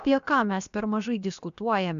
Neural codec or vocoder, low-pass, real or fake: codec, 16 kHz, about 1 kbps, DyCAST, with the encoder's durations; 7.2 kHz; fake